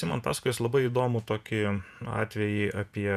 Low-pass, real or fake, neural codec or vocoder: 14.4 kHz; fake; vocoder, 48 kHz, 128 mel bands, Vocos